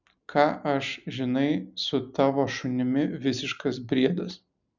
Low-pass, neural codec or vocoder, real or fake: 7.2 kHz; none; real